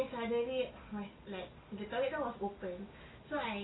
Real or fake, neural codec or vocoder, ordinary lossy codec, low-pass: real; none; AAC, 16 kbps; 7.2 kHz